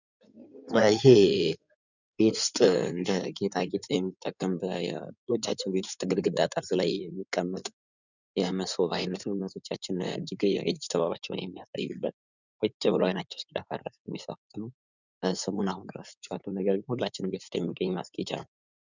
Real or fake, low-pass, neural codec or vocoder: fake; 7.2 kHz; codec, 16 kHz in and 24 kHz out, 2.2 kbps, FireRedTTS-2 codec